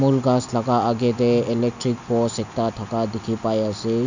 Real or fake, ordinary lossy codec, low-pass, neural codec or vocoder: real; none; 7.2 kHz; none